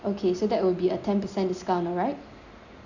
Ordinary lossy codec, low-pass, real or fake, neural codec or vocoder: none; 7.2 kHz; real; none